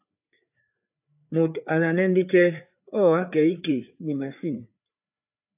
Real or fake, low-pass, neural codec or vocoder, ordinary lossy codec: fake; 3.6 kHz; codec, 16 kHz, 4 kbps, FreqCodec, larger model; AAC, 32 kbps